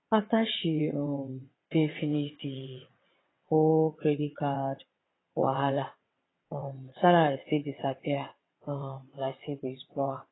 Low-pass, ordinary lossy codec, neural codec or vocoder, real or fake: 7.2 kHz; AAC, 16 kbps; vocoder, 22.05 kHz, 80 mel bands, WaveNeXt; fake